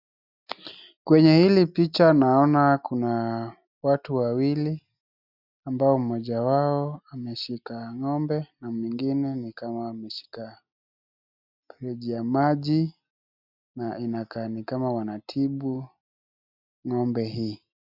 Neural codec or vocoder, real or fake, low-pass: none; real; 5.4 kHz